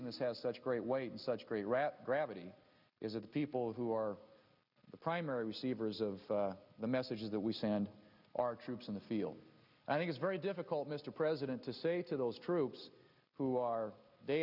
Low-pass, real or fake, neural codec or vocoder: 5.4 kHz; real; none